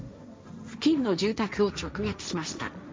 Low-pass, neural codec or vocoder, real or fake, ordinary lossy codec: none; codec, 16 kHz, 1.1 kbps, Voila-Tokenizer; fake; none